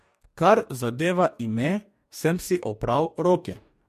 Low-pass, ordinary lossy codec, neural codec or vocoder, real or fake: 14.4 kHz; MP3, 64 kbps; codec, 44.1 kHz, 2.6 kbps, DAC; fake